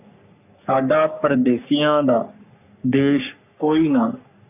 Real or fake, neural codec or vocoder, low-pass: fake; codec, 44.1 kHz, 3.4 kbps, Pupu-Codec; 3.6 kHz